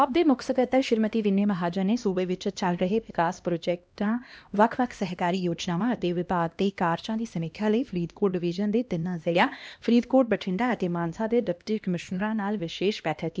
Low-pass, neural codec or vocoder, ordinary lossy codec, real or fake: none; codec, 16 kHz, 1 kbps, X-Codec, HuBERT features, trained on LibriSpeech; none; fake